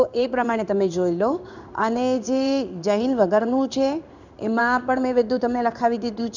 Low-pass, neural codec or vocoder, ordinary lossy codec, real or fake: 7.2 kHz; codec, 16 kHz in and 24 kHz out, 1 kbps, XY-Tokenizer; none; fake